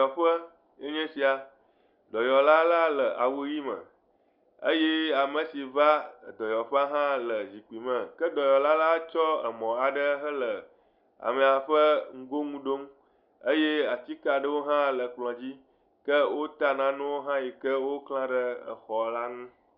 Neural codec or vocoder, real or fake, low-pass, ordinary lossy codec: none; real; 5.4 kHz; Opus, 64 kbps